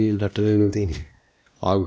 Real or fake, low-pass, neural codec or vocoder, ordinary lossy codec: fake; none; codec, 16 kHz, 1 kbps, X-Codec, WavLM features, trained on Multilingual LibriSpeech; none